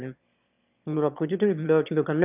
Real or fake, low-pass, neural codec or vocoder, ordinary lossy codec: fake; 3.6 kHz; autoencoder, 22.05 kHz, a latent of 192 numbers a frame, VITS, trained on one speaker; none